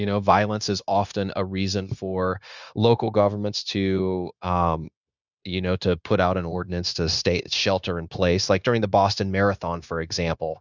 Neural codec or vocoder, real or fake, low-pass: codec, 16 kHz, 0.9 kbps, LongCat-Audio-Codec; fake; 7.2 kHz